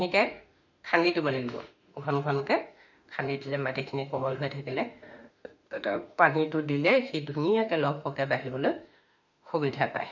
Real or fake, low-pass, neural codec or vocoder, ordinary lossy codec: fake; 7.2 kHz; autoencoder, 48 kHz, 32 numbers a frame, DAC-VAE, trained on Japanese speech; none